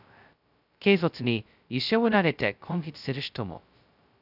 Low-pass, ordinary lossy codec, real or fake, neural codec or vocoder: 5.4 kHz; none; fake; codec, 16 kHz, 0.2 kbps, FocalCodec